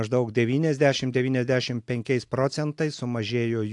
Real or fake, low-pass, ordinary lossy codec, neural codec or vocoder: real; 10.8 kHz; AAC, 64 kbps; none